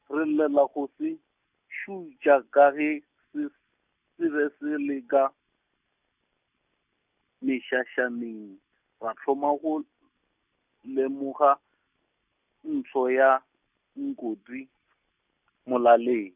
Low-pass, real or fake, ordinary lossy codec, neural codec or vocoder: 3.6 kHz; real; none; none